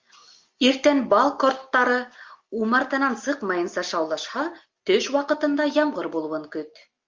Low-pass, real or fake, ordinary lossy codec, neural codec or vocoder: 7.2 kHz; real; Opus, 32 kbps; none